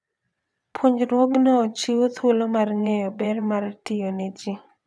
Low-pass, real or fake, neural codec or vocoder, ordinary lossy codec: none; fake; vocoder, 22.05 kHz, 80 mel bands, WaveNeXt; none